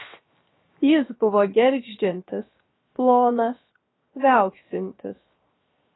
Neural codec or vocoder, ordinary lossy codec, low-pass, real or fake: codec, 16 kHz, 0.7 kbps, FocalCodec; AAC, 16 kbps; 7.2 kHz; fake